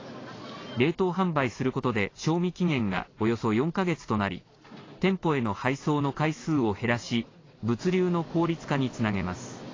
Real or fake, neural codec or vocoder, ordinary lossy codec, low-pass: real; none; AAC, 32 kbps; 7.2 kHz